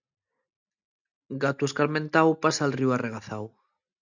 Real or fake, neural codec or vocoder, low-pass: real; none; 7.2 kHz